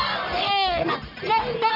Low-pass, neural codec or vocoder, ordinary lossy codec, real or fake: 5.4 kHz; codec, 44.1 kHz, 1.7 kbps, Pupu-Codec; none; fake